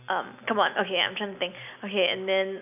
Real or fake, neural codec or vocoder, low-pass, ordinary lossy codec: real; none; 3.6 kHz; none